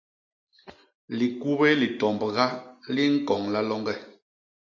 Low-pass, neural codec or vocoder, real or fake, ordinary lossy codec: 7.2 kHz; none; real; AAC, 48 kbps